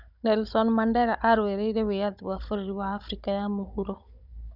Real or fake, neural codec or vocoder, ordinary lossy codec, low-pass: fake; codec, 16 kHz, 16 kbps, FunCodec, trained on Chinese and English, 50 frames a second; none; 5.4 kHz